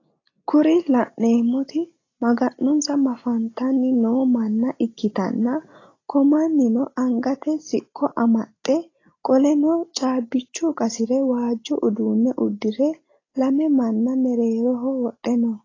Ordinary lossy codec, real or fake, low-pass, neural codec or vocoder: AAC, 32 kbps; real; 7.2 kHz; none